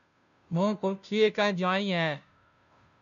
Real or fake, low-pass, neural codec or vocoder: fake; 7.2 kHz; codec, 16 kHz, 0.5 kbps, FunCodec, trained on Chinese and English, 25 frames a second